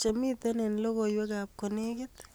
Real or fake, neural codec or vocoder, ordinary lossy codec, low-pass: real; none; none; none